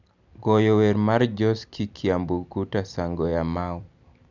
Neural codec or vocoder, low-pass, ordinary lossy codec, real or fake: none; 7.2 kHz; none; real